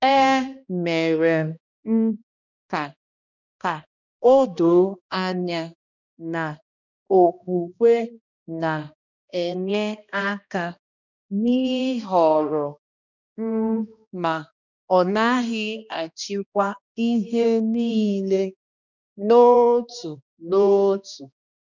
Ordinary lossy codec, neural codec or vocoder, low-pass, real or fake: none; codec, 16 kHz, 1 kbps, X-Codec, HuBERT features, trained on balanced general audio; 7.2 kHz; fake